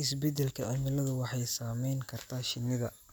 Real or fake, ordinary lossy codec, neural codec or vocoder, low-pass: real; none; none; none